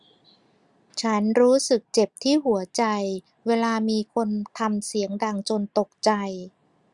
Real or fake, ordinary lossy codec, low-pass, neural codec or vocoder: real; Opus, 64 kbps; 10.8 kHz; none